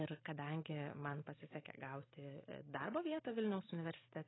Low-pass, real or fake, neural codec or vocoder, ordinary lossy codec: 7.2 kHz; real; none; AAC, 16 kbps